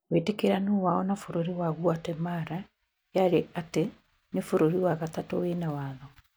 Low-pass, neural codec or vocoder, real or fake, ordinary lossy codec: none; none; real; none